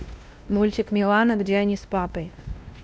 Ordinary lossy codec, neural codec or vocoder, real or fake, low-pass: none; codec, 16 kHz, 1 kbps, X-Codec, WavLM features, trained on Multilingual LibriSpeech; fake; none